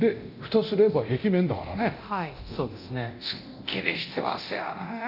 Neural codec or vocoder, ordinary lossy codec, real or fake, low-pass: codec, 24 kHz, 0.9 kbps, DualCodec; none; fake; 5.4 kHz